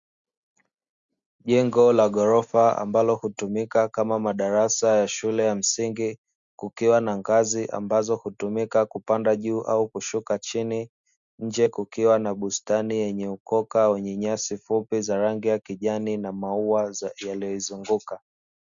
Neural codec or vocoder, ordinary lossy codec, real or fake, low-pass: none; Opus, 64 kbps; real; 7.2 kHz